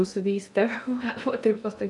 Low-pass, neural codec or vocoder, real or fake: 10.8 kHz; codec, 16 kHz in and 24 kHz out, 0.6 kbps, FocalCodec, streaming, 2048 codes; fake